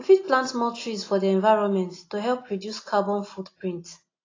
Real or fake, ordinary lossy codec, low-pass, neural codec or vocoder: real; AAC, 32 kbps; 7.2 kHz; none